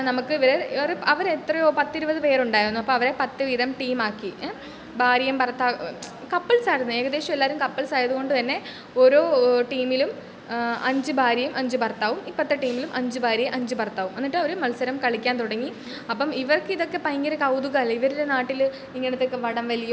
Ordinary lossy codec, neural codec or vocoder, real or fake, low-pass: none; none; real; none